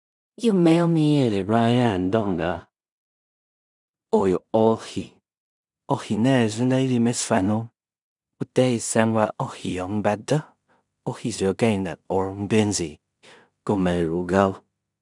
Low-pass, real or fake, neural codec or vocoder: 10.8 kHz; fake; codec, 16 kHz in and 24 kHz out, 0.4 kbps, LongCat-Audio-Codec, two codebook decoder